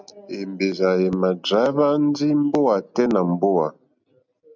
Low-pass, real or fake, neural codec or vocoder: 7.2 kHz; real; none